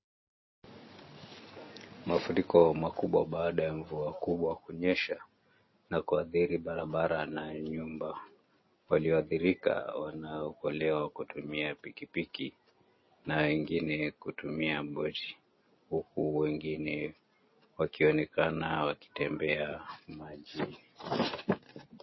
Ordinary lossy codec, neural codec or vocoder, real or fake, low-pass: MP3, 24 kbps; none; real; 7.2 kHz